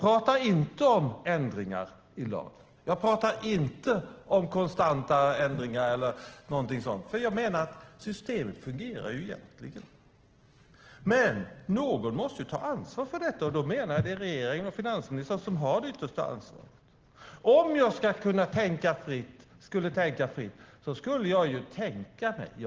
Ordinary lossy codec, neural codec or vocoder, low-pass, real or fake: Opus, 16 kbps; none; 7.2 kHz; real